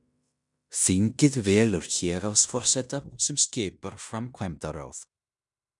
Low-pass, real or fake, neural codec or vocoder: 10.8 kHz; fake; codec, 16 kHz in and 24 kHz out, 0.9 kbps, LongCat-Audio-Codec, fine tuned four codebook decoder